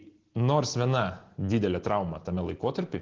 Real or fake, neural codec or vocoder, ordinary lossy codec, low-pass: real; none; Opus, 16 kbps; 7.2 kHz